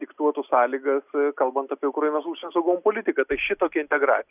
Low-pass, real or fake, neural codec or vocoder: 3.6 kHz; real; none